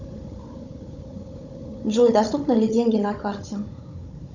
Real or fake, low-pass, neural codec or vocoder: fake; 7.2 kHz; codec, 16 kHz, 16 kbps, FunCodec, trained on Chinese and English, 50 frames a second